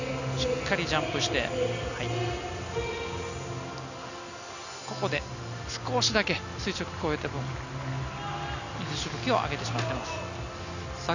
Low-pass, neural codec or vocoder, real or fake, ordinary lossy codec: 7.2 kHz; none; real; none